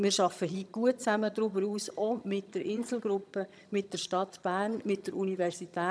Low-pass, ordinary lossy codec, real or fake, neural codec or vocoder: none; none; fake; vocoder, 22.05 kHz, 80 mel bands, HiFi-GAN